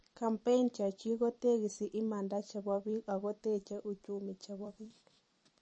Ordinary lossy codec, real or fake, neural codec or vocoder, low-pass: MP3, 32 kbps; fake; vocoder, 44.1 kHz, 128 mel bands every 256 samples, BigVGAN v2; 9.9 kHz